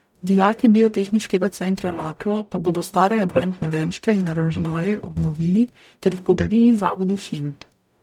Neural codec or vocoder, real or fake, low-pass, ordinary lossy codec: codec, 44.1 kHz, 0.9 kbps, DAC; fake; 19.8 kHz; none